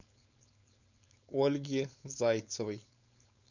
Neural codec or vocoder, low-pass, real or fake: codec, 16 kHz, 4.8 kbps, FACodec; 7.2 kHz; fake